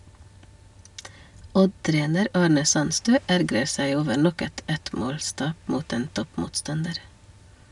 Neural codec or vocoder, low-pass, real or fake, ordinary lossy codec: none; 10.8 kHz; real; none